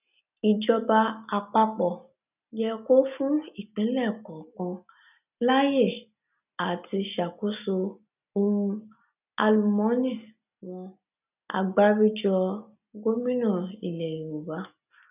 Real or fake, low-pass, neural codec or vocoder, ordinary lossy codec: real; 3.6 kHz; none; none